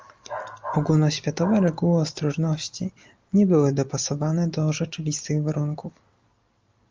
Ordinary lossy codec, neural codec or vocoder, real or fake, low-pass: Opus, 32 kbps; none; real; 7.2 kHz